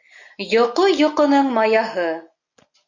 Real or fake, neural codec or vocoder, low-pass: real; none; 7.2 kHz